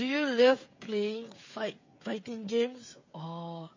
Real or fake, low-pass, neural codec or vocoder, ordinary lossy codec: fake; 7.2 kHz; codec, 16 kHz, 16 kbps, FreqCodec, smaller model; MP3, 32 kbps